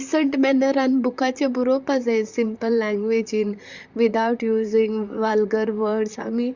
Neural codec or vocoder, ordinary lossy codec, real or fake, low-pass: vocoder, 44.1 kHz, 128 mel bands, Pupu-Vocoder; Opus, 64 kbps; fake; 7.2 kHz